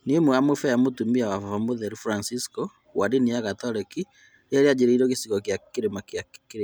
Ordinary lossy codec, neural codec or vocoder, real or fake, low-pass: none; none; real; none